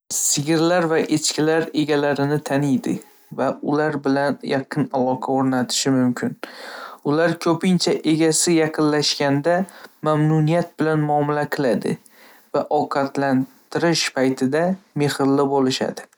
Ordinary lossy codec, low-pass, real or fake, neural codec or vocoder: none; none; real; none